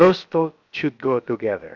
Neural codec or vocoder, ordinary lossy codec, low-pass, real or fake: codec, 16 kHz, about 1 kbps, DyCAST, with the encoder's durations; MP3, 64 kbps; 7.2 kHz; fake